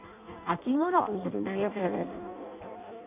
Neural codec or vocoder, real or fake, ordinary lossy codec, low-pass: codec, 16 kHz in and 24 kHz out, 0.6 kbps, FireRedTTS-2 codec; fake; none; 3.6 kHz